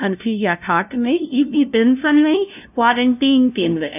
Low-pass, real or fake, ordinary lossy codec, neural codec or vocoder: 3.6 kHz; fake; none; codec, 16 kHz, 0.5 kbps, FunCodec, trained on LibriTTS, 25 frames a second